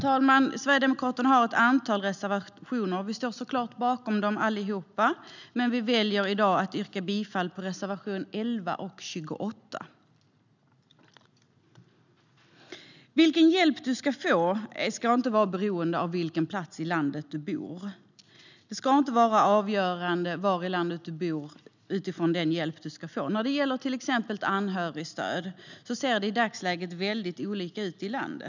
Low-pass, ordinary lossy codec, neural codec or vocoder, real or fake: 7.2 kHz; none; none; real